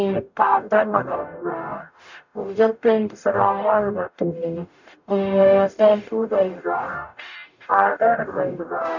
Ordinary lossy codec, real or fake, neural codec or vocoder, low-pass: none; fake; codec, 44.1 kHz, 0.9 kbps, DAC; 7.2 kHz